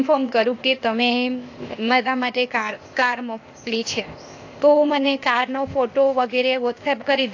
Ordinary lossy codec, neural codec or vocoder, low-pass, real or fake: AAC, 48 kbps; codec, 16 kHz, 0.8 kbps, ZipCodec; 7.2 kHz; fake